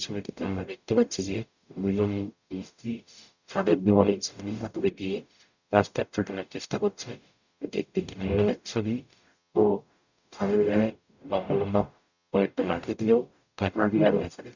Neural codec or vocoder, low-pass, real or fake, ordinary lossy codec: codec, 44.1 kHz, 0.9 kbps, DAC; 7.2 kHz; fake; none